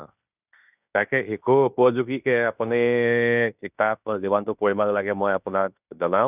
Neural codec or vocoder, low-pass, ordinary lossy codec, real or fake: codec, 16 kHz in and 24 kHz out, 0.9 kbps, LongCat-Audio-Codec, fine tuned four codebook decoder; 3.6 kHz; none; fake